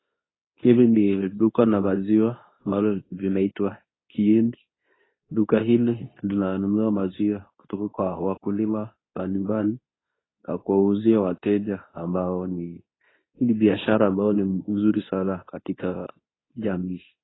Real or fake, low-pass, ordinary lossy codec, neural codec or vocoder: fake; 7.2 kHz; AAC, 16 kbps; codec, 24 kHz, 0.9 kbps, WavTokenizer, medium speech release version 2